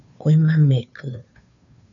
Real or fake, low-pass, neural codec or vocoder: fake; 7.2 kHz; codec, 16 kHz, 2 kbps, FunCodec, trained on Chinese and English, 25 frames a second